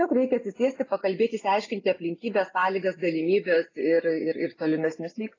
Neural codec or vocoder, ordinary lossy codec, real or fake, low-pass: none; AAC, 32 kbps; real; 7.2 kHz